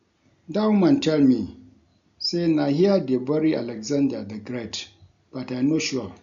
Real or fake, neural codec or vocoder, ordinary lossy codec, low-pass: real; none; none; 7.2 kHz